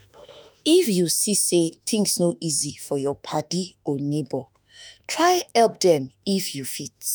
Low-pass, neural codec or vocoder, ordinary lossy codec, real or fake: none; autoencoder, 48 kHz, 32 numbers a frame, DAC-VAE, trained on Japanese speech; none; fake